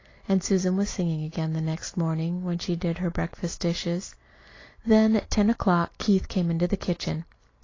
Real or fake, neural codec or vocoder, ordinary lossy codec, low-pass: real; none; AAC, 32 kbps; 7.2 kHz